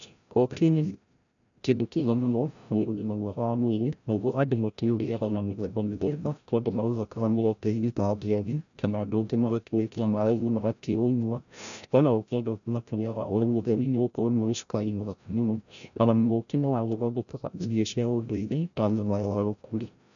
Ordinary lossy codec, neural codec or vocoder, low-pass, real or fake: none; codec, 16 kHz, 0.5 kbps, FreqCodec, larger model; 7.2 kHz; fake